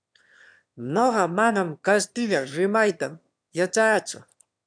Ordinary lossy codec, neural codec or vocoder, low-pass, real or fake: MP3, 96 kbps; autoencoder, 22.05 kHz, a latent of 192 numbers a frame, VITS, trained on one speaker; 9.9 kHz; fake